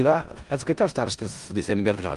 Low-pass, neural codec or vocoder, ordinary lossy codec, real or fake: 10.8 kHz; codec, 16 kHz in and 24 kHz out, 0.4 kbps, LongCat-Audio-Codec, four codebook decoder; Opus, 32 kbps; fake